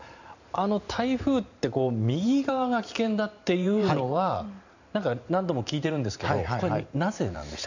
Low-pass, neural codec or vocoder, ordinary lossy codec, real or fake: 7.2 kHz; vocoder, 44.1 kHz, 128 mel bands every 512 samples, BigVGAN v2; none; fake